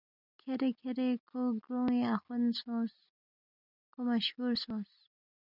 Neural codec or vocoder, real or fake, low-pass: none; real; 5.4 kHz